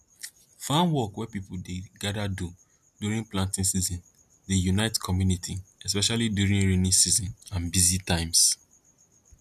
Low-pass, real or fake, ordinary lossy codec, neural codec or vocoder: 14.4 kHz; real; none; none